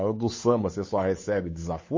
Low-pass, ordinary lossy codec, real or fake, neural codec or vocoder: 7.2 kHz; MP3, 32 kbps; fake; codec, 16 kHz, 8 kbps, FunCodec, trained on Chinese and English, 25 frames a second